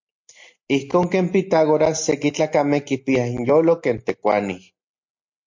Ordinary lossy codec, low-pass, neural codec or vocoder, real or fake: MP3, 48 kbps; 7.2 kHz; none; real